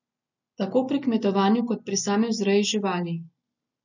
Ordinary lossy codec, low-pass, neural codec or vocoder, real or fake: none; 7.2 kHz; none; real